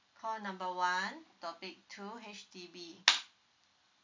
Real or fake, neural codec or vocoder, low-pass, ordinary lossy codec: real; none; 7.2 kHz; MP3, 64 kbps